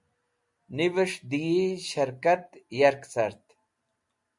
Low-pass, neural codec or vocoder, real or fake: 10.8 kHz; none; real